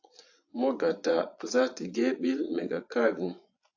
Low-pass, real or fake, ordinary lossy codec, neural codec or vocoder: 7.2 kHz; fake; AAC, 48 kbps; vocoder, 22.05 kHz, 80 mel bands, Vocos